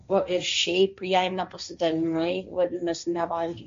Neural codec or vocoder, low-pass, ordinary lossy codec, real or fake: codec, 16 kHz, 1.1 kbps, Voila-Tokenizer; 7.2 kHz; MP3, 48 kbps; fake